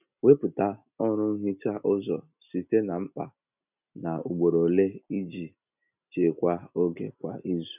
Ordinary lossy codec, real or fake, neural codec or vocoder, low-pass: none; real; none; 3.6 kHz